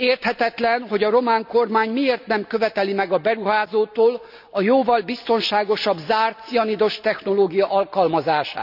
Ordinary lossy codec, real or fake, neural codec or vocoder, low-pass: none; real; none; 5.4 kHz